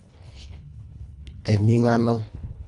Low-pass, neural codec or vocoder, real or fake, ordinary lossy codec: 10.8 kHz; codec, 24 kHz, 1.5 kbps, HILCodec; fake; none